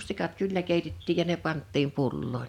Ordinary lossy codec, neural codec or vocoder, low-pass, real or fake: none; none; 19.8 kHz; real